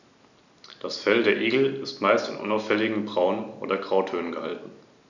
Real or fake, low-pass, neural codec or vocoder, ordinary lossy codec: real; 7.2 kHz; none; none